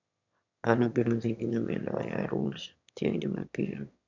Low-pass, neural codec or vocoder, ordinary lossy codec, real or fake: 7.2 kHz; autoencoder, 22.05 kHz, a latent of 192 numbers a frame, VITS, trained on one speaker; none; fake